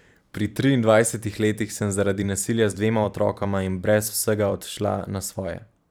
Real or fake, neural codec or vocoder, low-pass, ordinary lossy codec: fake; vocoder, 44.1 kHz, 128 mel bands every 512 samples, BigVGAN v2; none; none